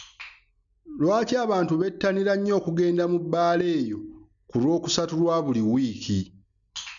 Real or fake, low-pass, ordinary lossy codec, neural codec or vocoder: real; 7.2 kHz; none; none